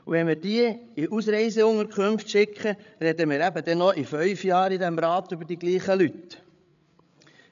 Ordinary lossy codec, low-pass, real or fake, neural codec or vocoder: none; 7.2 kHz; fake; codec, 16 kHz, 8 kbps, FreqCodec, larger model